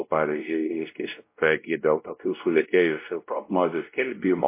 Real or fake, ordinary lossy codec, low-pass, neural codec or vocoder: fake; MP3, 24 kbps; 3.6 kHz; codec, 16 kHz, 0.5 kbps, X-Codec, WavLM features, trained on Multilingual LibriSpeech